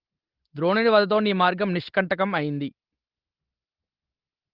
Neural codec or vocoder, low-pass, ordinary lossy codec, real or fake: none; 5.4 kHz; Opus, 16 kbps; real